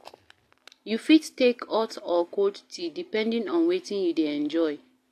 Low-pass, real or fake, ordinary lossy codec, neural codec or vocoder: 14.4 kHz; real; AAC, 64 kbps; none